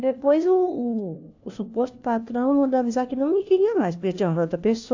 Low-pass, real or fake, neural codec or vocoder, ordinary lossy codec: 7.2 kHz; fake; codec, 16 kHz, 1 kbps, FunCodec, trained on LibriTTS, 50 frames a second; AAC, 48 kbps